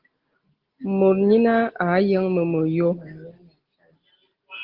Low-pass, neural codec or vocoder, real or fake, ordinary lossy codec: 5.4 kHz; none; real; Opus, 16 kbps